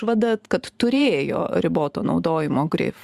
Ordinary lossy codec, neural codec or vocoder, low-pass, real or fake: Opus, 64 kbps; vocoder, 44.1 kHz, 128 mel bands every 512 samples, BigVGAN v2; 14.4 kHz; fake